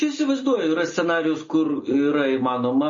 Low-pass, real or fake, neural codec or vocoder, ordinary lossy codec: 7.2 kHz; real; none; MP3, 32 kbps